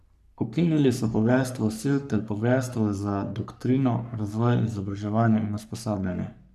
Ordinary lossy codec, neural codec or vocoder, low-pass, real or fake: none; codec, 44.1 kHz, 3.4 kbps, Pupu-Codec; 14.4 kHz; fake